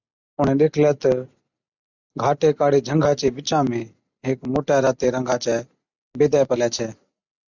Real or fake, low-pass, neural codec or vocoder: real; 7.2 kHz; none